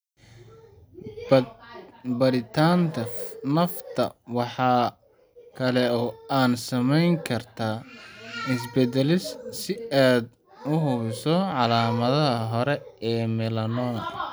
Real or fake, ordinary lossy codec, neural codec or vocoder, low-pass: real; none; none; none